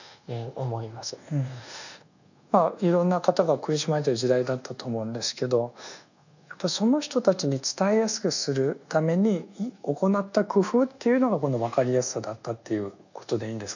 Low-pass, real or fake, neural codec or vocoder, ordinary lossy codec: 7.2 kHz; fake; codec, 24 kHz, 1.2 kbps, DualCodec; none